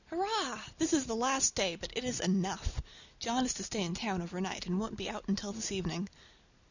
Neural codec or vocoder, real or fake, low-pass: none; real; 7.2 kHz